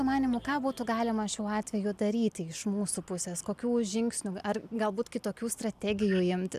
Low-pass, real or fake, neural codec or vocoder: 14.4 kHz; real; none